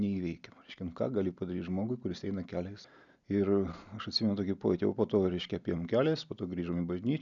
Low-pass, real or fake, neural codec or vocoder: 7.2 kHz; real; none